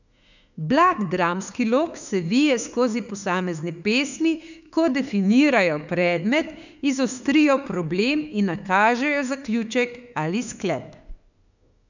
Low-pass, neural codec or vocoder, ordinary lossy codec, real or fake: 7.2 kHz; autoencoder, 48 kHz, 32 numbers a frame, DAC-VAE, trained on Japanese speech; none; fake